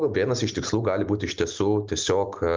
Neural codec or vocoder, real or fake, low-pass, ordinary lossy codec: none; real; 7.2 kHz; Opus, 24 kbps